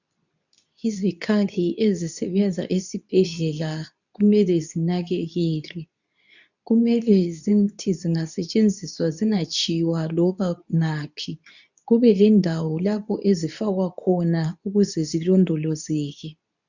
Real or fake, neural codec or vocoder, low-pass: fake; codec, 24 kHz, 0.9 kbps, WavTokenizer, medium speech release version 2; 7.2 kHz